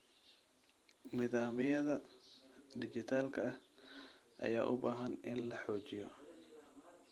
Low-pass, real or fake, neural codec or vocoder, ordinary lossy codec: 19.8 kHz; fake; vocoder, 44.1 kHz, 128 mel bands every 512 samples, BigVGAN v2; Opus, 24 kbps